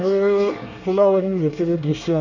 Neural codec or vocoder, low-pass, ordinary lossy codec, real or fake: codec, 24 kHz, 1 kbps, SNAC; 7.2 kHz; none; fake